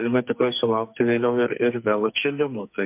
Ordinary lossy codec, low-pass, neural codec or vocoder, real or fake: MP3, 32 kbps; 3.6 kHz; codec, 44.1 kHz, 2.6 kbps, SNAC; fake